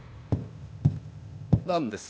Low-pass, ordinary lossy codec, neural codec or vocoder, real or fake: none; none; codec, 16 kHz, 0.8 kbps, ZipCodec; fake